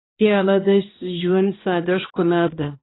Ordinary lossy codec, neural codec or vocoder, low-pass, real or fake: AAC, 16 kbps; codec, 16 kHz, 1 kbps, X-Codec, HuBERT features, trained on balanced general audio; 7.2 kHz; fake